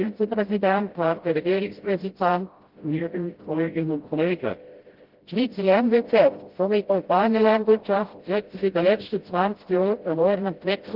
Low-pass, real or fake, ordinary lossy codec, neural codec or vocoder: 5.4 kHz; fake; Opus, 16 kbps; codec, 16 kHz, 0.5 kbps, FreqCodec, smaller model